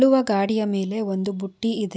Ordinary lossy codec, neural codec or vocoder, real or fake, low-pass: none; none; real; none